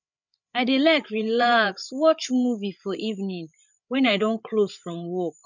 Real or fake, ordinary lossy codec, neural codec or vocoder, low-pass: fake; none; codec, 16 kHz, 8 kbps, FreqCodec, larger model; 7.2 kHz